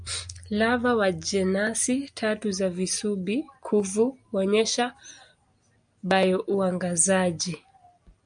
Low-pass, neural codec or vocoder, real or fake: 9.9 kHz; none; real